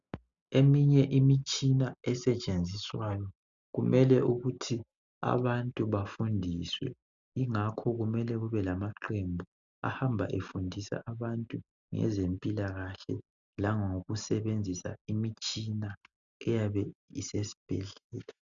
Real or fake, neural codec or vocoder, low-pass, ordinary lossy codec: real; none; 7.2 kHz; MP3, 96 kbps